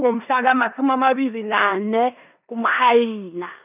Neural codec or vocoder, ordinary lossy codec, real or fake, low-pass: codec, 16 kHz in and 24 kHz out, 0.9 kbps, LongCat-Audio-Codec, four codebook decoder; none; fake; 3.6 kHz